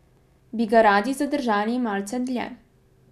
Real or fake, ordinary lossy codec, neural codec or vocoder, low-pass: real; none; none; 14.4 kHz